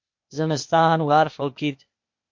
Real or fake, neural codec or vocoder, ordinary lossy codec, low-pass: fake; codec, 16 kHz, 0.8 kbps, ZipCodec; MP3, 48 kbps; 7.2 kHz